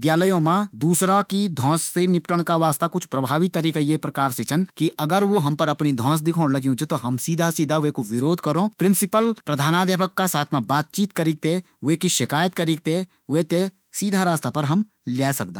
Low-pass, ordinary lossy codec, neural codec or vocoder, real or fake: none; none; autoencoder, 48 kHz, 32 numbers a frame, DAC-VAE, trained on Japanese speech; fake